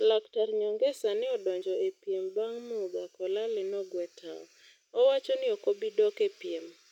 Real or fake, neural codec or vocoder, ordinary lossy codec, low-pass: real; none; none; 19.8 kHz